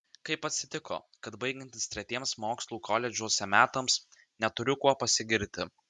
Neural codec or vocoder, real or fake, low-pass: none; real; 10.8 kHz